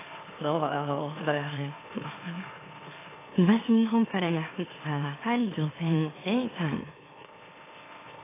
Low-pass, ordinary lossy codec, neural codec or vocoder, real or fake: 3.6 kHz; AAC, 16 kbps; autoencoder, 44.1 kHz, a latent of 192 numbers a frame, MeloTTS; fake